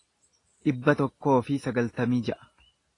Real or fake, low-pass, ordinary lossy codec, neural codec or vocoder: real; 10.8 kHz; AAC, 32 kbps; none